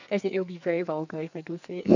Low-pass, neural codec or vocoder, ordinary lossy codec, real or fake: 7.2 kHz; codec, 44.1 kHz, 2.6 kbps, SNAC; none; fake